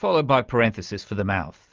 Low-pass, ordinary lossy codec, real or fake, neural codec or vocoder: 7.2 kHz; Opus, 24 kbps; real; none